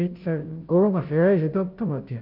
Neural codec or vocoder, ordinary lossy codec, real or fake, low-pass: codec, 16 kHz, 0.5 kbps, FunCodec, trained on Chinese and English, 25 frames a second; Opus, 32 kbps; fake; 5.4 kHz